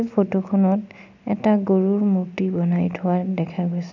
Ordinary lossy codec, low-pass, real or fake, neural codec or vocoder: none; 7.2 kHz; real; none